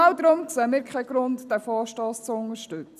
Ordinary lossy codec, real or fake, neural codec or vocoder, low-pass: none; real; none; 14.4 kHz